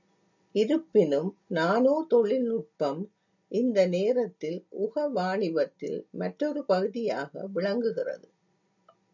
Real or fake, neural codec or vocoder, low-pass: real; none; 7.2 kHz